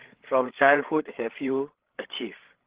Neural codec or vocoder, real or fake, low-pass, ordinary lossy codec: codec, 16 kHz, 8 kbps, FreqCodec, larger model; fake; 3.6 kHz; Opus, 16 kbps